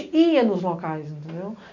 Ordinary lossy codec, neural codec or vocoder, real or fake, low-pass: none; none; real; 7.2 kHz